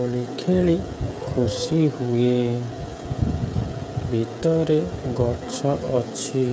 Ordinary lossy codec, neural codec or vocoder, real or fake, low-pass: none; codec, 16 kHz, 16 kbps, FreqCodec, smaller model; fake; none